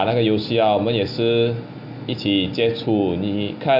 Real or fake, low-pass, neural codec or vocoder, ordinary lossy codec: real; 5.4 kHz; none; none